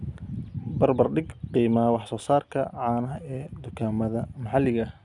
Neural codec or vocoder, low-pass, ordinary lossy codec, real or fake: none; 10.8 kHz; none; real